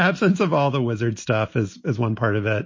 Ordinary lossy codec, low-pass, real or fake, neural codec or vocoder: MP3, 32 kbps; 7.2 kHz; real; none